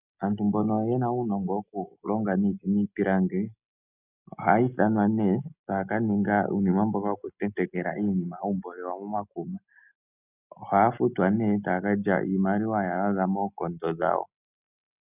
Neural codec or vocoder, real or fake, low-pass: none; real; 3.6 kHz